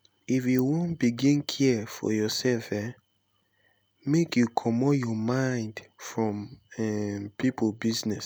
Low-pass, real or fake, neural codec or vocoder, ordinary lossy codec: none; real; none; none